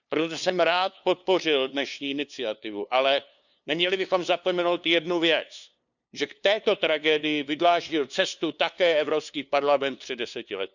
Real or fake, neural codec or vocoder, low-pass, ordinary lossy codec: fake; codec, 16 kHz, 2 kbps, FunCodec, trained on LibriTTS, 25 frames a second; 7.2 kHz; none